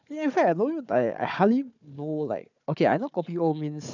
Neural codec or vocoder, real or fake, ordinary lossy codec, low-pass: codec, 16 kHz, 4 kbps, FunCodec, trained on Chinese and English, 50 frames a second; fake; none; 7.2 kHz